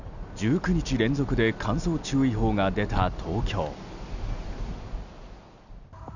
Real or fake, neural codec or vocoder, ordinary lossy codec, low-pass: real; none; none; 7.2 kHz